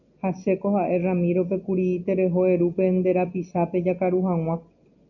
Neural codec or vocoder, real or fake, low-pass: none; real; 7.2 kHz